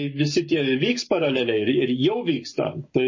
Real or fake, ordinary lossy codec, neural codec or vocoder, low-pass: fake; MP3, 32 kbps; codec, 44.1 kHz, 7.8 kbps, Pupu-Codec; 7.2 kHz